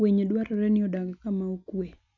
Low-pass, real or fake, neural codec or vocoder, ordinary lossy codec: 7.2 kHz; real; none; none